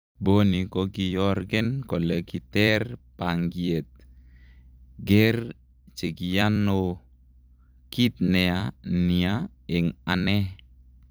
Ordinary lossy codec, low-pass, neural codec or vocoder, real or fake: none; none; vocoder, 44.1 kHz, 128 mel bands every 256 samples, BigVGAN v2; fake